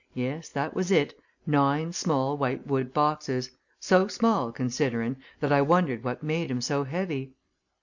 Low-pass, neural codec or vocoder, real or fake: 7.2 kHz; none; real